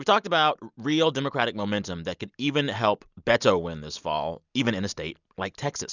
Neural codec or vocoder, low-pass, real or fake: none; 7.2 kHz; real